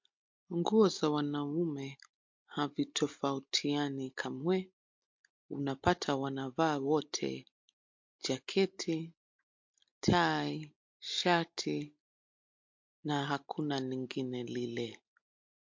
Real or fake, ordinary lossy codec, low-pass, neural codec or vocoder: real; MP3, 48 kbps; 7.2 kHz; none